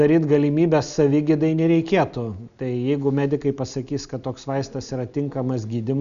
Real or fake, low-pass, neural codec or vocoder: real; 7.2 kHz; none